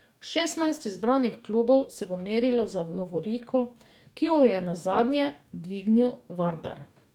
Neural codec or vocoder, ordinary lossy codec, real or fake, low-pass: codec, 44.1 kHz, 2.6 kbps, DAC; none; fake; 19.8 kHz